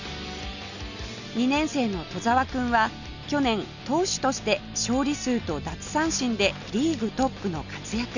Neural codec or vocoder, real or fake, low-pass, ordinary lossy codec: none; real; 7.2 kHz; none